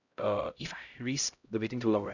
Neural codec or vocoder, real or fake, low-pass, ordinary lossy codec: codec, 16 kHz, 0.5 kbps, X-Codec, HuBERT features, trained on LibriSpeech; fake; 7.2 kHz; none